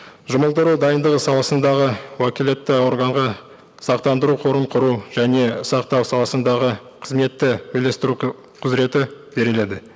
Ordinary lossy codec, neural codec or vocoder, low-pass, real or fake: none; none; none; real